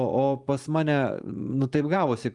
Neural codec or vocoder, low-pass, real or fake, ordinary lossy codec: vocoder, 44.1 kHz, 128 mel bands every 512 samples, BigVGAN v2; 10.8 kHz; fake; Opus, 32 kbps